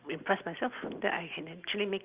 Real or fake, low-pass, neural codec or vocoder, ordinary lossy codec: real; 3.6 kHz; none; Opus, 24 kbps